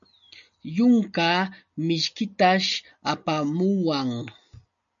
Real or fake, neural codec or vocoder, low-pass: real; none; 7.2 kHz